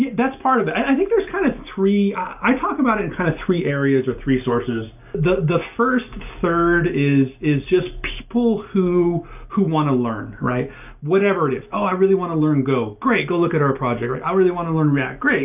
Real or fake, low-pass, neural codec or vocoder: real; 3.6 kHz; none